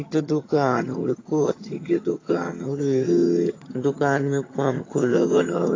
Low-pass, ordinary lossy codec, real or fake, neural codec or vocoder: 7.2 kHz; AAC, 32 kbps; fake; vocoder, 22.05 kHz, 80 mel bands, HiFi-GAN